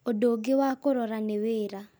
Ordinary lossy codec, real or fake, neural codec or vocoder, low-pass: none; real; none; none